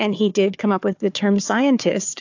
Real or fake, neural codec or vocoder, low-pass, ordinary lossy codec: fake; codec, 16 kHz, 4 kbps, FunCodec, trained on Chinese and English, 50 frames a second; 7.2 kHz; AAC, 48 kbps